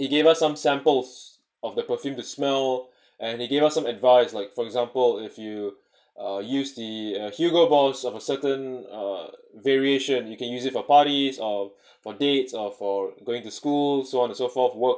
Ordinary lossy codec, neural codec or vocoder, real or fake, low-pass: none; none; real; none